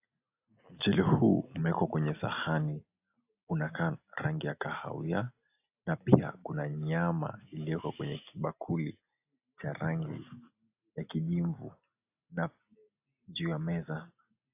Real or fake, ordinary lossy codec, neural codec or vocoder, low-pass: real; AAC, 32 kbps; none; 3.6 kHz